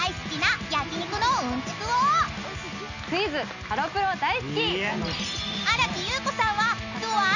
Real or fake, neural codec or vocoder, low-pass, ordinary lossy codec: real; none; 7.2 kHz; none